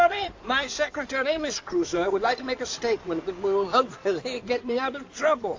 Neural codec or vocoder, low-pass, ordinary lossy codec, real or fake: codec, 16 kHz in and 24 kHz out, 2.2 kbps, FireRedTTS-2 codec; 7.2 kHz; AAC, 48 kbps; fake